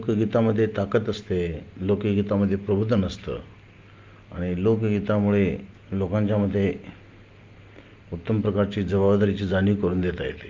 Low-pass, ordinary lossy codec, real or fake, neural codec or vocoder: 7.2 kHz; Opus, 32 kbps; real; none